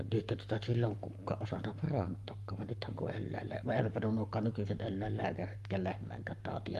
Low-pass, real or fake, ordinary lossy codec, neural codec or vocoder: 14.4 kHz; fake; Opus, 32 kbps; codec, 44.1 kHz, 7.8 kbps, Pupu-Codec